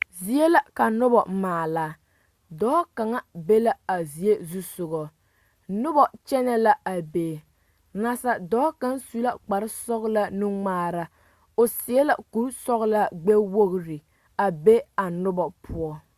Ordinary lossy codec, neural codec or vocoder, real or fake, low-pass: Opus, 64 kbps; none; real; 14.4 kHz